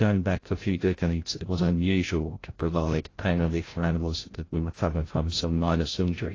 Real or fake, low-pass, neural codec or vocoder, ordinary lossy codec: fake; 7.2 kHz; codec, 16 kHz, 0.5 kbps, FreqCodec, larger model; AAC, 32 kbps